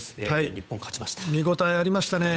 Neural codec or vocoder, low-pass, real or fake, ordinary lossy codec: codec, 16 kHz, 8 kbps, FunCodec, trained on Chinese and English, 25 frames a second; none; fake; none